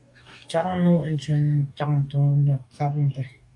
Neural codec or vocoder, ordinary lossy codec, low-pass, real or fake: codec, 44.1 kHz, 2.6 kbps, DAC; AAC, 64 kbps; 10.8 kHz; fake